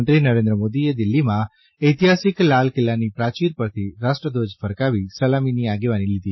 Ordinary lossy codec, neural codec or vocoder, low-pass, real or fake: MP3, 24 kbps; none; 7.2 kHz; real